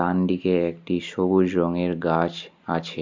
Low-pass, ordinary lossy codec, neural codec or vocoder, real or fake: 7.2 kHz; MP3, 48 kbps; none; real